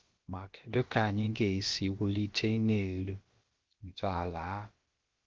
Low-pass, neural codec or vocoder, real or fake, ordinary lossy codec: 7.2 kHz; codec, 16 kHz, about 1 kbps, DyCAST, with the encoder's durations; fake; Opus, 16 kbps